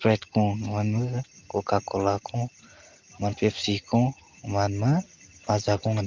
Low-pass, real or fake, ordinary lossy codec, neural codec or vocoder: 7.2 kHz; real; Opus, 24 kbps; none